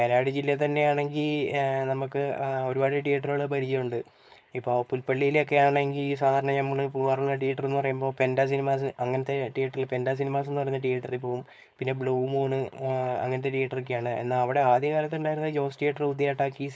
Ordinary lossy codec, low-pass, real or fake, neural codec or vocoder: none; none; fake; codec, 16 kHz, 4.8 kbps, FACodec